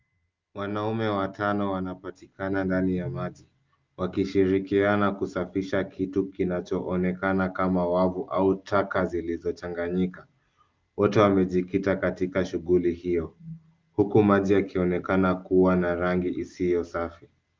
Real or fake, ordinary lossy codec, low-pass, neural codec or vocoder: real; Opus, 24 kbps; 7.2 kHz; none